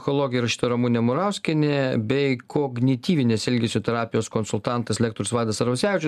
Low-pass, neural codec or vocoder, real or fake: 14.4 kHz; none; real